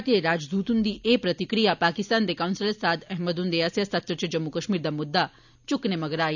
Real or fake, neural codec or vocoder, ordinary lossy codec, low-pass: real; none; none; 7.2 kHz